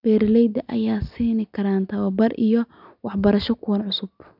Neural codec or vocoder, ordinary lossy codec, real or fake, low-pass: none; none; real; 5.4 kHz